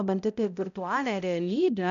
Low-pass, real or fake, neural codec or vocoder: 7.2 kHz; fake; codec, 16 kHz, 0.5 kbps, X-Codec, HuBERT features, trained on balanced general audio